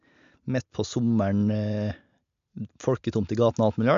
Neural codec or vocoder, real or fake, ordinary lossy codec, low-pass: none; real; MP3, 64 kbps; 7.2 kHz